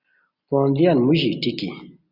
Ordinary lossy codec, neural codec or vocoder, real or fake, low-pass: Opus, 64 kbps; none; real; 5.4 kHz